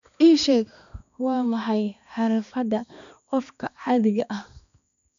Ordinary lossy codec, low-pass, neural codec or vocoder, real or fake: none; 7.2 kHz; codec, 16 kHz, 2 kbps, X-Codec, HuBERT features, trained on balanced general audio; fake